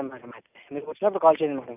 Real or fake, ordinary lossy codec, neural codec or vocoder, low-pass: real; none; none; 3.6 kHz